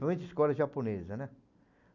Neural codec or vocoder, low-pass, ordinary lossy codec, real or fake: none; 7.2 kHz; none; real